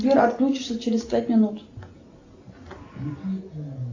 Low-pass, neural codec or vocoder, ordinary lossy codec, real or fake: 7.2 kHz; none; AAC, 48 kbps; real